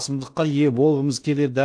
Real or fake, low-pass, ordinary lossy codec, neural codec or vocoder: fake; 9.9 kHz; AAC, 64 kbps; codec, 16 kHz in and 24 kHz out, 0.8 kbps, FocalCodec, streaming, 65536 codes